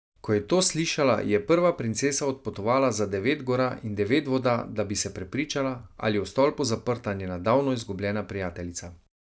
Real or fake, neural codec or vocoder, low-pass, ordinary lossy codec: real; none; none; none